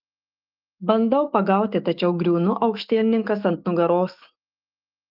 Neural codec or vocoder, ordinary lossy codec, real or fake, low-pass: autoencoder, 48 kHz, 128 numbers a frame, DAC-VAE, trained on Japanese speech; Opus, 32 kbps; fake; 5.4 kHz